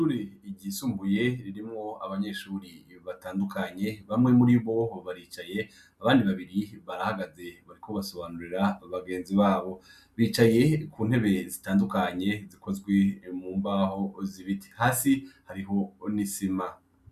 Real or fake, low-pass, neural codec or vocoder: real; 14.4 kHz; none